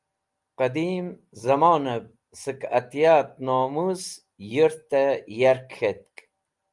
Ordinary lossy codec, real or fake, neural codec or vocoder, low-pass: Opus, 32 kbps; real; none; 10.8 kHz